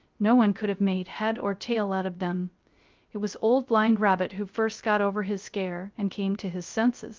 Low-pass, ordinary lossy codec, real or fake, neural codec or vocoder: 7.2 kHz; Opus, 24 kbps; fake; codec, 16 kHz, 0.3 kbps, FocalCodec